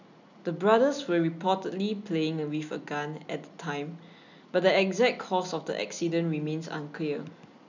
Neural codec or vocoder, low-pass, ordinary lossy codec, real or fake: none; 7.2 kHz; none; real